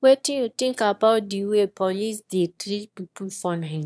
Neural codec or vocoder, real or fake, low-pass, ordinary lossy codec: autoencoder, 22.05 kHz, a latent of 192 numbers a frame, VITS, trained on one speaker; fake; none; none